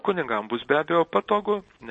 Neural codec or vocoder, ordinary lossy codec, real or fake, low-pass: none; MP3, 32 kbps; real; 10.8 kHz